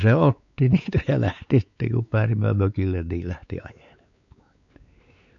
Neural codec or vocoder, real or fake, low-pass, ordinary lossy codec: codec, 16 kHz, 4 kbps, X-Codec, WavLM features, trained on Multilingual LibriSpeech; fake; 7.2 kHz; none